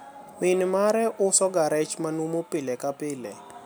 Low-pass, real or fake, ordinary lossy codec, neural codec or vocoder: none; real; none; none